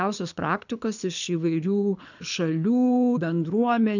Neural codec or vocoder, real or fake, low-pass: codec, 24 kHz, 6 kbps, HILCodec; fake; 7.2 kHz